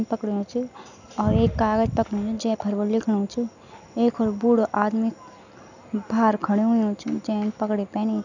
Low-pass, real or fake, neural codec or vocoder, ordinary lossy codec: 7.2 kHz; real; none; none